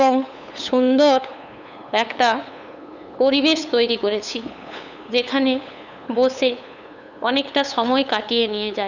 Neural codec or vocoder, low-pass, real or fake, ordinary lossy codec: codec, 16 kHz, 8 kbps, FunCodec, trained on LibriTTS, 25 frames a second; 7.2 kHz; fake; none